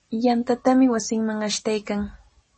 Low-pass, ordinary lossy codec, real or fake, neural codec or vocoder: 10.8 kHz; MP3, 32 kbps; real; none